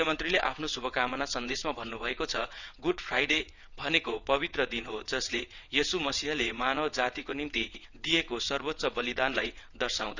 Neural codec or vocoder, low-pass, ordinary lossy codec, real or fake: vocoder, 22.05 kHz, 80 mel bands, WaveNeXt; 7.2 kHz; none; fake